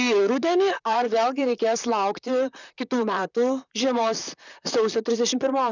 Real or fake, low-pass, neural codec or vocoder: fake; 7.2 kHz; vocoder, 44.1 kHz, 128 mel bands, Pupu-Vocoder